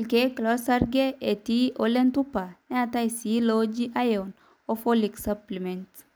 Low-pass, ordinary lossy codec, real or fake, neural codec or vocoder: none; none; real; none